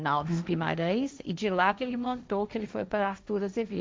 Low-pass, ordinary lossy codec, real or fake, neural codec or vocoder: none; none; fake; codec, 16 kHz, 1.1 kbps, Voila-Tokenizer